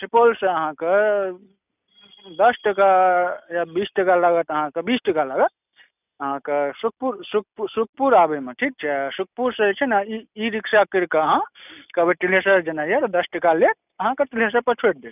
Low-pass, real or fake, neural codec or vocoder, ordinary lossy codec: 3.6 kHz; real; none; none